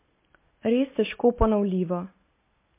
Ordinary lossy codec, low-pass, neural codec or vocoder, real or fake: MP3, 24 kbps; 3.6 kHz; none; real